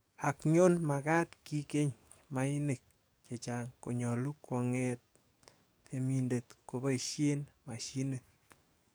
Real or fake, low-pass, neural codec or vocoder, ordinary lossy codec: fake; none; codec, 44.1 kHz, 7.8 kbps, DAC; none